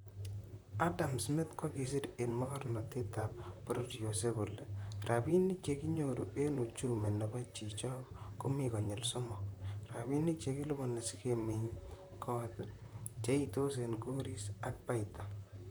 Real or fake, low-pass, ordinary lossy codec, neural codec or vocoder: fake; none; none; vocoder, 44.1 kHz, 128 mel bands, Pupu-Vocoder